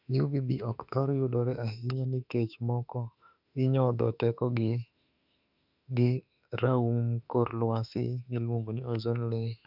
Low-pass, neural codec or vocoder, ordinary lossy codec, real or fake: 5.4 kHz; autoencoder, 48 kHz, 32 numbers a frame, DAC-VAE, trained on Japanese speech; none; fake